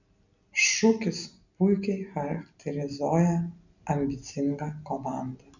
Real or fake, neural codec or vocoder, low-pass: real; none; 7.2 kHz